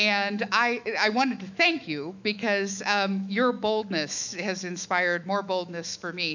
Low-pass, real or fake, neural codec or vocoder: 7.2 kHz; fake; autoencoder, 48 kHz, 128 numbers a frame, DAC-VAE, trained on Japanese speech